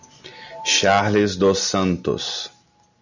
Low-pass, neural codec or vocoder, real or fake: 7.2 kHz; none; real